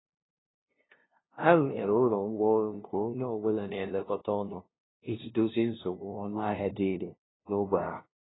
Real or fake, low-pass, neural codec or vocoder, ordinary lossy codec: fake; 7.2 kHz; codec, 16 kHz, 0.5 kbps, FunCodec, trained on LibriTTS, 25 frames a second; AAC, 16 kbps